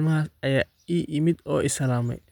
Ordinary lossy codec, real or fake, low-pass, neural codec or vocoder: none; real; 19.8 kHz; none